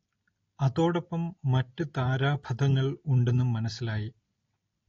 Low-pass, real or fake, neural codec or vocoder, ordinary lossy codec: 7.2 kHz; real; none; AAC, 32 kbps